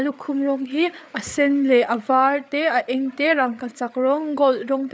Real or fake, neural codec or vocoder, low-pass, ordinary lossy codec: fake; codec, 16 kHz, 8 kbps, FreqCodec, larger model; none; none